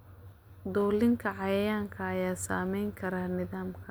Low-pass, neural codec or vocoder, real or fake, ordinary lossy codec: none; none; real; none